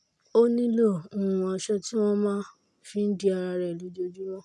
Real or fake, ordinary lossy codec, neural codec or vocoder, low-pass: real; none; none; none